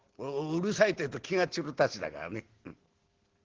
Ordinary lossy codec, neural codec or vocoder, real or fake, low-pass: Opus, 16 kbps; none; real; 7.2 kHz